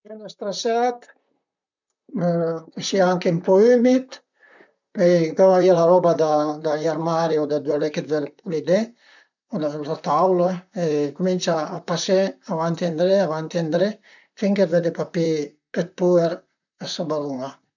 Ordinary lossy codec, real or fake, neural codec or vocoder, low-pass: none; fake; vocoder, 44.1 kHz, 128 mel bands, Pupu-Vocoder; 7.2 kHz